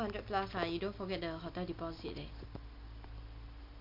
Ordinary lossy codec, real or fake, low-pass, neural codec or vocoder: MP3, 48 kbps; real; 5.4 kHz; none